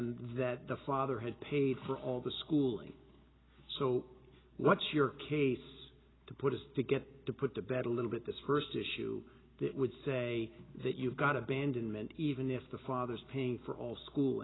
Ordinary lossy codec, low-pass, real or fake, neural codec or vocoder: AAC, 16 kbps; 7.2 kHz; real; none